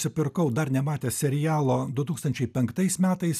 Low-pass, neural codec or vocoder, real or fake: 14.4 kHz; none; real